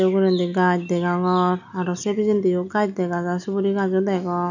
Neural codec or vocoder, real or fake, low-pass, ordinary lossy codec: none; real; 7.2 kHz; none